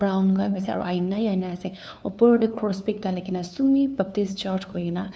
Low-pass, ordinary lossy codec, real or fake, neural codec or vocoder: none; none; fake; codec, 16 kHz, 2 kbps, FunCodec, trained on LibriTTS, 25 frames a second